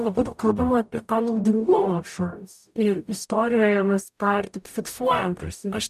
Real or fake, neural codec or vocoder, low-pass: fake; codec, 44.1 kHz, 0.9 kbps, DAC; 14.4 kHz